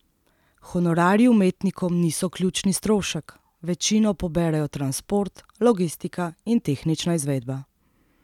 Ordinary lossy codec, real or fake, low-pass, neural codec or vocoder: none; real; 19.8 kHz; none